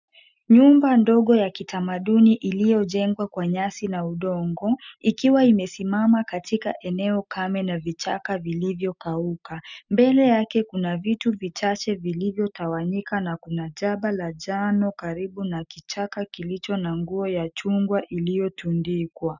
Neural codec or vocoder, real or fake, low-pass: none; real; 7.2 kHz